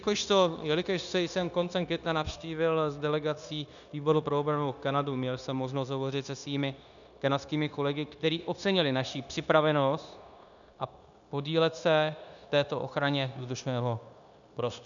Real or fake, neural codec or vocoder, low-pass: fake; codec, 16 kHz, 0.9 kbps, LongCat-Audio-Codec; 7.2 kHz